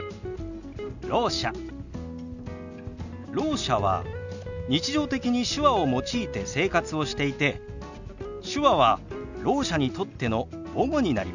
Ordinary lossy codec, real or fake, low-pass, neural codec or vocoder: none; real; 7.2 kHz; none